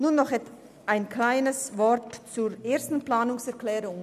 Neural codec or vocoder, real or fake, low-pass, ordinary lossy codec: none; real; 14.4 kHz; none